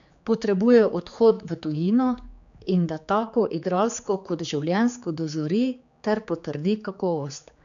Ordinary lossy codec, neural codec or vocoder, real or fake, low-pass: none; codec, 16 kHz, 4 kbps, X-Codec, HuBERT features, trained on general audio; fake; 7.2 kHz